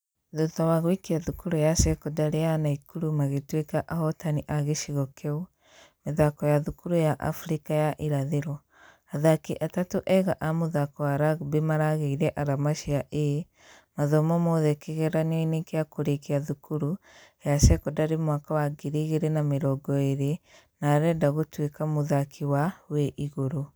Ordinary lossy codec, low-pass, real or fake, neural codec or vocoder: none; none; real; none